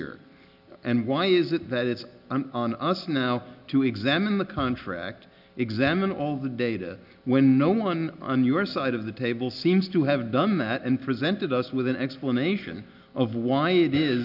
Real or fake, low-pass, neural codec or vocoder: real; 5.4 kHz; none